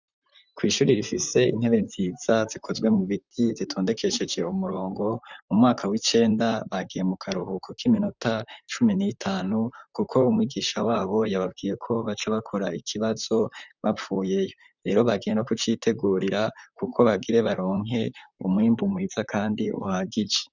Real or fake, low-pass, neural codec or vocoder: fake; 7.2 kHz; vocoder, 44.1 kHz, 128 mel bands, Pupu-Vocoder